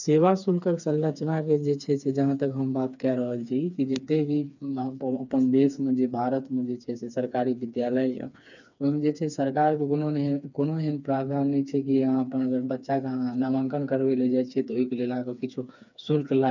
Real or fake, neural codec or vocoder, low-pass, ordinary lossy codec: fake; codec, 16 kHz, 4 kbps, FreqCodec, smaller model; 7.2 kHz; none